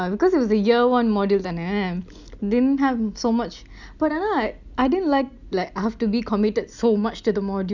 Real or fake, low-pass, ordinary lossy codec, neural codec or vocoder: real; 7.2 kHz; none; none